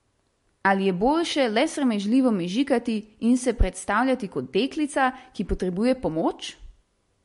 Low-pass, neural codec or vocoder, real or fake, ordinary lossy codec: 14.4 kHz; none; real; MP3, 48 kbps